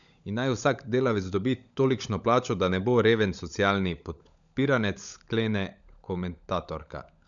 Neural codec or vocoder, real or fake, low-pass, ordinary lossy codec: codec, 16 kHz, 16 kbps, FunCodec, trained on LibriTTS, 50 frames a second; fake; 7.2 kHz; none